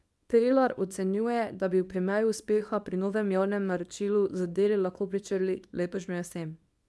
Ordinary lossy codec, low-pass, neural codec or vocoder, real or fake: none; none; codec, 24 kHz, 0.9 kbps, WavTokenizer, small release; fake